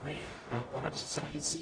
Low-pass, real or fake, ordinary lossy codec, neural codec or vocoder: 9.9 kHz; fake; AAC, 32 kbps; codec, 44.1 kHz, 0.9 kbps, DAC